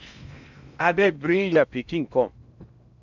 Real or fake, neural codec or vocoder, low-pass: fake; codec, 16 kHz in and 24 kHz out, 0.8 kbps, FocalCodec, streaming, 65536 codes; 7.2 kHz